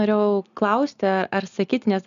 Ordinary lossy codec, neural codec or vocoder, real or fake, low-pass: MP3, 96 kbps; none; real; 7.2 kHz